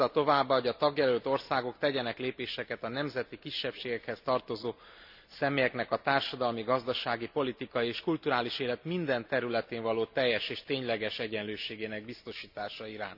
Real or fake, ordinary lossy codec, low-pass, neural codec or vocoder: real; none; 5.4 kHz; none